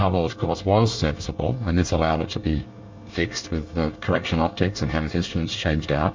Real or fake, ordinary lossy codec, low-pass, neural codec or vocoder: fake; MP3, 64 kbps; 7.2 kHz; codec, 24 kHz, 1 kbps, SNAC